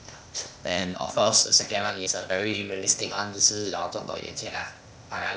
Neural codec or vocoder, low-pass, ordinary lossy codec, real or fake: codec, 16 kHz, 0.8 kbps, ZipCodec; none; none; fake